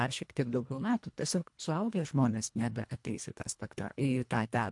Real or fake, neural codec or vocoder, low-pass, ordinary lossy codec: fake; codec, 24 kHz, 1.5 kbps, HILCodec; 10.8 kHz; MP3, 64 kbps